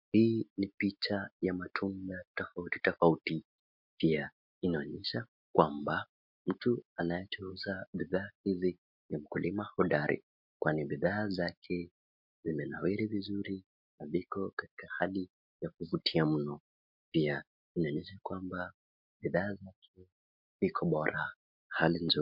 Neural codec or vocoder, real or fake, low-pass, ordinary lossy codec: none; real; 5.4 kHz; MP3, 48 kbps